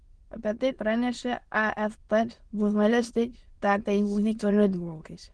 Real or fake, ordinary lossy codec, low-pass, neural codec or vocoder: fake; Opus, 16 kbps; 9.9 kHz; autoencoder, 22.05 kHz, a latent of 192 numbers a frame, VITS, trained on many speakers